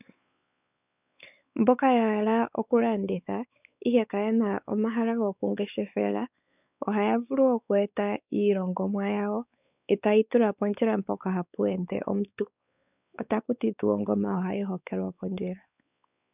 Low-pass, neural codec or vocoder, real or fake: 3.6 kHz; codec, 16 kHz, 4 kbps, X-Codec, WavLM features, trained on Multilingual LibriSpeech; fake